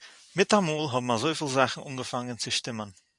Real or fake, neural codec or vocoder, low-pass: fake; vocoder, 44.1 kHz, 128 mel bands every 512 samples, BigVGAN v2; 10.8 kHz